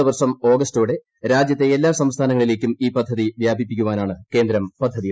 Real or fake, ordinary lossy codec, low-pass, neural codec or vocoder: real; none; none; none